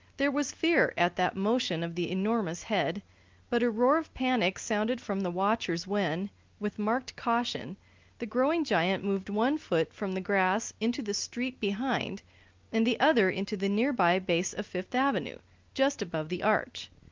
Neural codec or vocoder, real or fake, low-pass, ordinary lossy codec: none; real; 7.2 kHz; Opus, 32 kbps